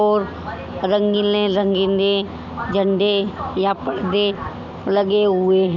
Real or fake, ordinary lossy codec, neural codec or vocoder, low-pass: real; none; none; 7.2 kHz